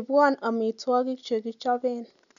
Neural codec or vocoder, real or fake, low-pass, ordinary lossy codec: none; real; 7.2 kHz; none